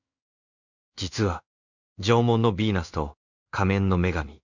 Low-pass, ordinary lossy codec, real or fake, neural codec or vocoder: 7.2 kHz; none; fake; codec, 16 kHz in and 24 kHz out, 1 kbps, XY-Tokenizer